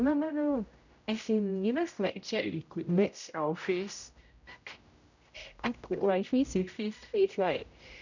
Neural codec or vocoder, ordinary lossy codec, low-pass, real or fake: codec, 16 kHz, 0.5 kbps, X-Codec, HuBERT features, trained on general audio; none; 7.2 kHz; fake